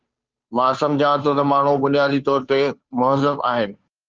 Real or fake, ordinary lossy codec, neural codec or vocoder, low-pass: fake; Opus, 24 kbps; codec, 16 kHz, 2 kbps, FunCodec, trained on Chinese and English, 25 frames a second; 7.2 kHz